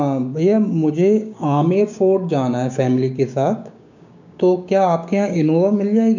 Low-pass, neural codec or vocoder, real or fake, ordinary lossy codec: 7.2 kHz; codec, 16 kHz, 6 kbps, DAC; fake; none